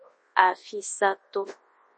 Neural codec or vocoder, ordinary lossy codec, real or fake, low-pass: codec, 24 kHz, 0.9 kbps, WavTokenizer, large speech release; MP3, 32 kbps; fake; 10.8 kHz